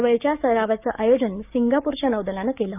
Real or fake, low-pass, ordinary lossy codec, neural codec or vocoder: fake; 3.6 kHz; none; codec, 16 kHz, 6 kbps, DAC